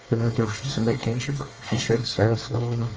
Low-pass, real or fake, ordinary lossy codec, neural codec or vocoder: 7.2 kHz; fake; Opus, 24 kbps; codec, 24 kHz, 1 kbps, SNAC